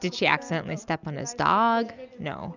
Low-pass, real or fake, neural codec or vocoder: 7.2 kHz; real; none